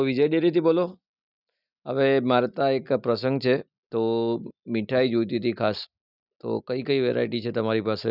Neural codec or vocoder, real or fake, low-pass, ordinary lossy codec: none; real; 5.4 kHz; none